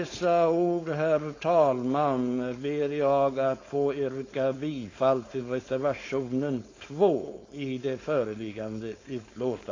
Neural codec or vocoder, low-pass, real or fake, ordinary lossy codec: codec, 16 kHz, 4.8 kbps, FACodec; 7.2 kHz; fake; AAC, 32 kbps